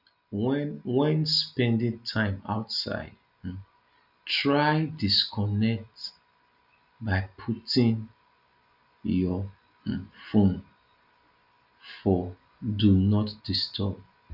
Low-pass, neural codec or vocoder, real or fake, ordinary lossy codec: 5.4 kHz; none; real; none